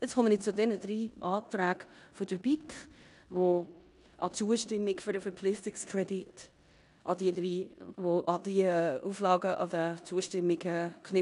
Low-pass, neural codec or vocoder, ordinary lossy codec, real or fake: 10.8 kHz; codec, 16 kHz in and 24 kHz out, 0.9 kbps, LongCat-Audio-Codec, four codebook decoder; none; fake